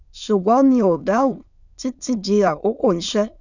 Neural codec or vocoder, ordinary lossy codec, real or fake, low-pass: autoencoder, 22.05 kHz, a latent of 192 numbers a frame, VITS, trained on many speakers; none; fake; 7.2 kHz